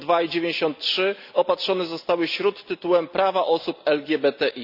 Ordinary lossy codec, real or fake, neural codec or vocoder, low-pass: none; real; none; 5.4 kHz